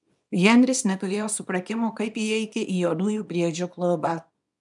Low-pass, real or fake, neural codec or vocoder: 10.8 kHz; fake; codec, 24 kHz, 0.9 kbps, WavTokenizer, small release